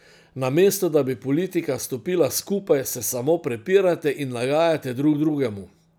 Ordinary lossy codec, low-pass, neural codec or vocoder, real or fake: none; none; none; real